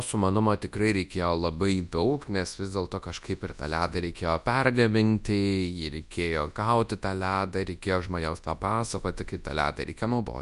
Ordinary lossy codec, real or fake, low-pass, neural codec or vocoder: Opus, 64 kbps; fake; 10.8 kHz; codec, 24 kHz, 0.9 kbps, WavTokenizer, large speech release